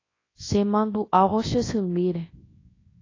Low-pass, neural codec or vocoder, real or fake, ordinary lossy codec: 7.2 kHz; codec, 24 kHz, 0.9 kbps, WavTokenizer, large speech release; fake; AAC, 32 kbps